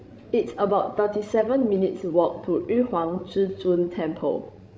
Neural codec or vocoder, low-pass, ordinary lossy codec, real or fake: codec, 16 kHz, 16 kbps, FreqCodec, larger model; none; none; fake